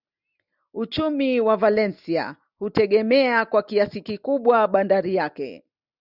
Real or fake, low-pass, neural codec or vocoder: real; 5.4 kHz; none